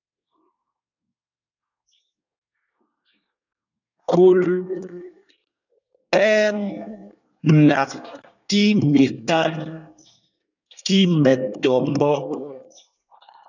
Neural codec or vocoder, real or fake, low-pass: codec, 24 kHz, 1 kbps, SNAC; fake; 7.2 kHz